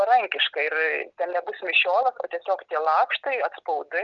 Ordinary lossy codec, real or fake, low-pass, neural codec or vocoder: Opus, 24 kbps; real; 7.2 kHz; none